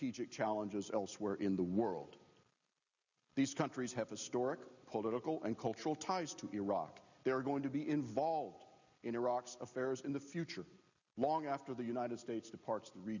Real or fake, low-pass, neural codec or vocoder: real; 7.2 kHz; none